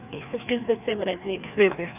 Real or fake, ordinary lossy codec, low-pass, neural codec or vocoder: fake; none; 3.6 kHz; codec, 16 kHz, 2 kbps, FreqCodec, larger model